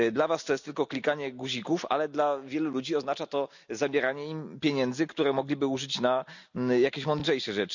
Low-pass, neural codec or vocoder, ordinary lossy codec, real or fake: 7.2 kHz; none; none; real